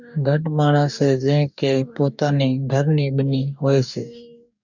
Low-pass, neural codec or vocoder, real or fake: 7.2 kHz; codec, 44.1 kHz, 2.6 kbps, DAC; fake